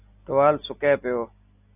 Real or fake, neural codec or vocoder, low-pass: real; none; 3.6 kHz